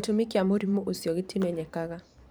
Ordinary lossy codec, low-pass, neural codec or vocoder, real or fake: none; none; vocoder, 44.1 kHz, 128 mel bands every 512 samples, BigVGAN v2; fake